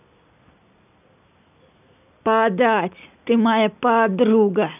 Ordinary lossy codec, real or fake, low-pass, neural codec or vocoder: none; real; 3.6 kHz; none